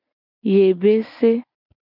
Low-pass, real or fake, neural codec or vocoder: 5.4 kHz; real; none